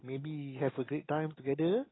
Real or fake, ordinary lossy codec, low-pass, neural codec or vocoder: real; AAC, 16 kbps; 7.2 kHz; none